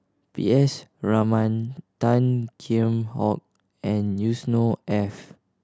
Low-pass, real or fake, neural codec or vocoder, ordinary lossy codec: none; real; none; none